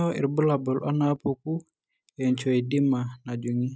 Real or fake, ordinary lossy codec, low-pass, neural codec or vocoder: real; none; none; none